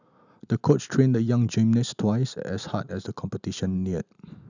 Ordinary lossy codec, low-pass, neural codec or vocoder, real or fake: none; 7.2 kHz; none; real